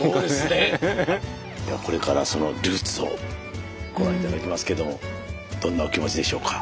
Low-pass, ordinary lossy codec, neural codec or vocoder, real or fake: none; none; none; real